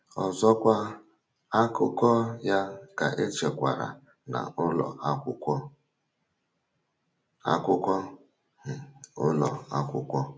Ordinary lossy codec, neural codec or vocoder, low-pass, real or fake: none; none; none; real